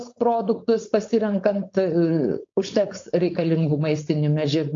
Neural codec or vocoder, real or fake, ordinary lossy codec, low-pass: codec, 16 kHz, 4.8 kbps, FACodec; fake; AAC, 48 kbps; 7.2 kHz